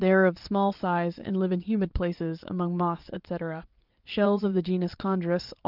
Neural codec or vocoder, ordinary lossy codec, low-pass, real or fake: vocoder, 22.05 kHz, 80 mel bands, Vocos; Opus, 32 kbps; 5.4 kHz; fake